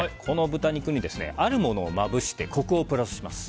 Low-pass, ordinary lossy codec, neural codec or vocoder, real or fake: none; none; none; real